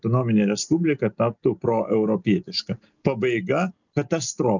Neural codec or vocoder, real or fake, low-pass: none; real; 7.2 kHz